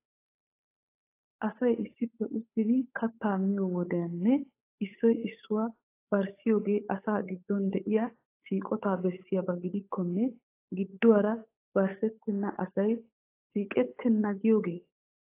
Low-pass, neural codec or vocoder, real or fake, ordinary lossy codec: 3.6 kHz; codec, 16 kHz, 8 kbps, FunCodec, trained on Chinese and English, 25 frames a second; fake; AAC, 24 kbps